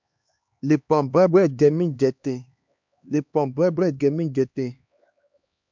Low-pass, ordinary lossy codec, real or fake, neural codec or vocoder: 7.2 kHz; MP3, 64 kbps; fake; codec, 16 kHz, 2 kbps, X-Codec, HuBERT features, trained on LibriSpeech